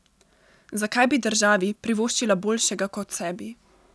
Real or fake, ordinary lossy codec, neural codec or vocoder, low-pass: real; none; none; none